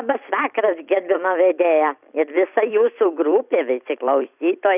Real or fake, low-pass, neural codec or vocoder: fake; 3.6 kHz; vocoder, 44.1 kHz, 128 mel bands every 256 samples, BigVGAN v2